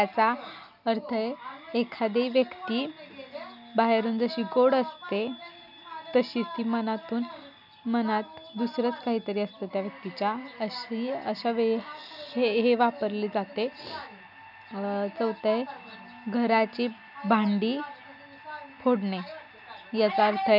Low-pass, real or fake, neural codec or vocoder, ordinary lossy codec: 5.4 kHz; real; none; none